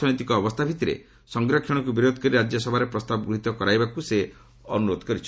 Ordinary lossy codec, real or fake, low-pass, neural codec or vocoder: none; real; none; none